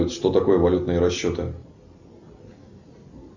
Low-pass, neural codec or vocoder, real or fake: 7.2 kHz; none; real